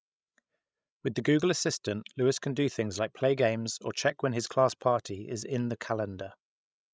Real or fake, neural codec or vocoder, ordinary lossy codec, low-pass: fake; codec, 16 kHz, 16 kbps, FreqCodec, larger model; none; none